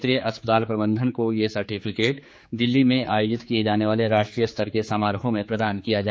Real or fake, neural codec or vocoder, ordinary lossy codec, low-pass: fake; codec, 16 kHz, 4 kbps, X-Codec, HuBERT features, trained on general audio; none; none